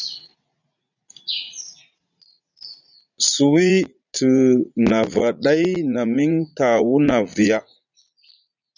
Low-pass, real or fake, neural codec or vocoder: 7.2 kHz; fake; vocoder, 44.1 kHz, 80 mel bands, Vocos